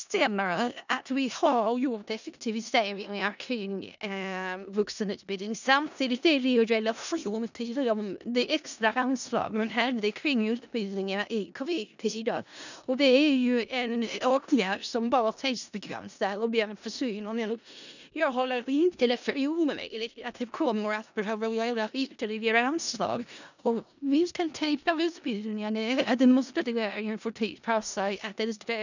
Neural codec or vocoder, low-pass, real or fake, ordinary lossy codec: codec, 16 kHz in and 24 kHz out, 0.4 kbps, LongCat-Audio-Codec, four codebook decoder; 7.2 kHz; fake; none